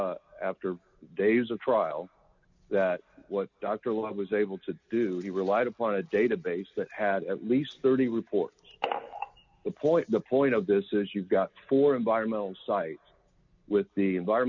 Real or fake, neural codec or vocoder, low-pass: real; none; 7.2 kHz